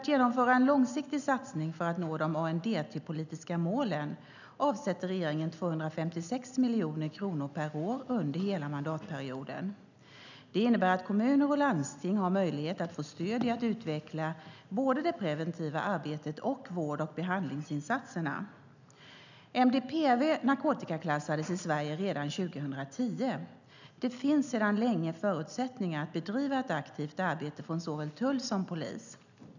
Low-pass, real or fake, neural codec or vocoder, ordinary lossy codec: 7.2 kHz; real; none; none